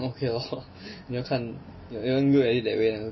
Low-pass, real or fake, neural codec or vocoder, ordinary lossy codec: 7.2 kHz; real; none; MP3, 24 kbps